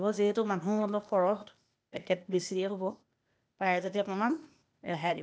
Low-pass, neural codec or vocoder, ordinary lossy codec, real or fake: none; codec, 16 kHz, 0.8 kbps, ZipCodec; none; fake